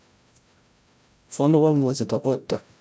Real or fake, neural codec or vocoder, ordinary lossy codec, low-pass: fake; codec, 16 kHz, 0.5 kbps, FreqCodec, larger model; none; none